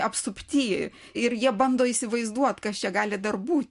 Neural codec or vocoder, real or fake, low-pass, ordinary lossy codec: none; real; 10.8 kHz; MP3, 64 kbps